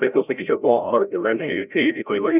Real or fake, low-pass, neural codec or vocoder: fake; 3.6 kHz; codec, 16 kHz, 0.5 kbps, FreqCodec, larger model